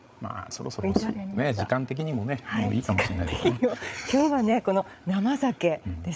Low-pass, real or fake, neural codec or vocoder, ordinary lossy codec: none; fake; codec, 16 kHz, 8 kbps, FreqCodec, larger model; none